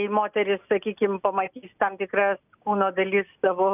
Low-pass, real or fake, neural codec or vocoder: 3.6 kHz; real; none